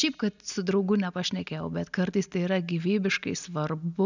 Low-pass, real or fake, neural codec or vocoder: 7.2 kHz; real; none